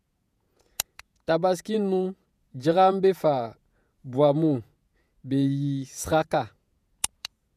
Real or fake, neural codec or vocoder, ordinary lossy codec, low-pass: fake; vocoder, 48 kHz, 128 mel bands, Vocos; none; 14.4 kHz